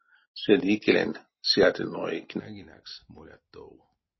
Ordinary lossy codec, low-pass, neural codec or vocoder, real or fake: MP3, 24 kbps; 7.2 kHz; none; real